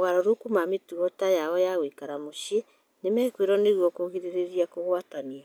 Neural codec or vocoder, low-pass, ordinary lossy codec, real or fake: none; none; none; real